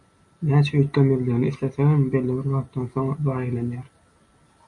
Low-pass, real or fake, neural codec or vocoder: 10.8 kHz; real; none